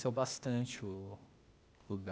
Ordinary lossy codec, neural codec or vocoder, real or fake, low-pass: none; codec, 16 kHz, 0.8 kbps, ZipCodec; fake; none